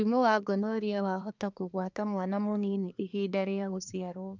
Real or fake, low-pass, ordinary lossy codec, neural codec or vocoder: fake; 7.2 kHz; none; codec, 24 kHz, 1 kbps, SNAC